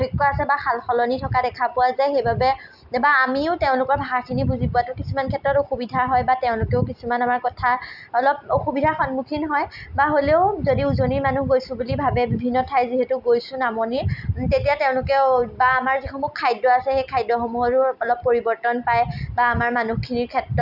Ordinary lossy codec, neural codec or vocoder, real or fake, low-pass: none; none; real; 5.4 kHz